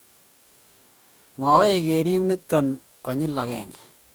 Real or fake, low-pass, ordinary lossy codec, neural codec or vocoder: fake; none; none; codec, 44.1 kHz, 2.6 kbps, DAC